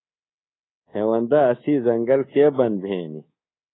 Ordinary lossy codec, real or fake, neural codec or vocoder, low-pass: AAC, 16 kbps; fake; codec, 24 kHz, 3.1 kbps, DualCodec; 7.2 kHz